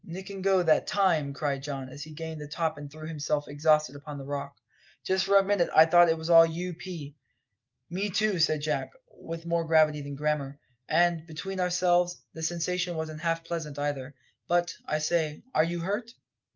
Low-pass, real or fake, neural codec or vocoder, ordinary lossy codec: 7.2 kHz; real; none; Opus, 24 kbps